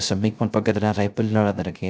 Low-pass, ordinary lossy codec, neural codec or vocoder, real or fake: none; none; codec, 16 kHz, 0.3 kbps, FocalCodec; fake